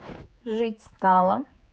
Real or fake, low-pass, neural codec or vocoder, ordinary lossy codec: fake; none; codec, 16 kHz, 4 kbps, X-Codec, HuBERT features, trained on general audio; none